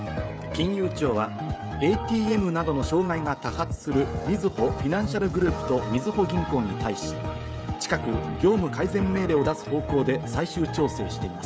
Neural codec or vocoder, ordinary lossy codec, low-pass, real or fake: codec, 16 kHz, 16 kbps, FreqCodec, smaller model; none; none; fake